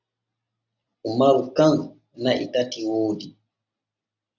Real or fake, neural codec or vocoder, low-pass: real; none; 7.2 kHz